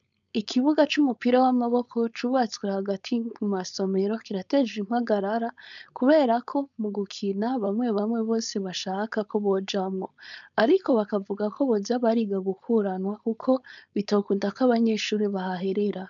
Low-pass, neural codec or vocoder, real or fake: 7.2 kHz; codec, 16 kHz, 4.8 kbps, FACodec; fake